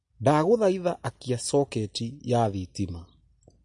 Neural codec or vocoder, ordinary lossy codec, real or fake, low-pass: none; MP3, 48 kbps; real; 10.8 kHz